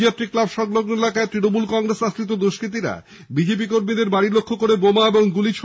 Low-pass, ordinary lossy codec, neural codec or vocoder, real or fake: none; none; none; real